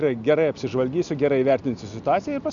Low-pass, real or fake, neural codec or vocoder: 7.2 kHz; real; none